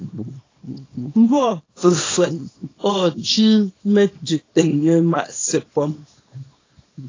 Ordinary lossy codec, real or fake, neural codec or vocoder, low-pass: AAC, 32 kbps; fake; codec, 24 kHz, 0.9 kbps, WavTokenizer, small release; 7.2 kHz